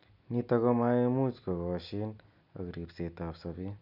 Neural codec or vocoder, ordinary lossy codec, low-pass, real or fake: none; none; 5.4 kHz; real